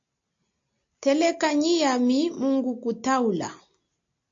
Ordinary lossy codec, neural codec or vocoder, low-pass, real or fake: AAC, 48 kbps; none; 7.2 kHz; real